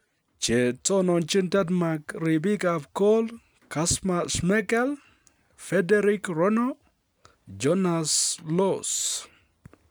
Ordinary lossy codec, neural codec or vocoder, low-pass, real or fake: none; none; none; real